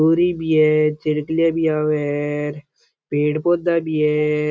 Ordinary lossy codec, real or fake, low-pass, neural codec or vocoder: none; real; none; none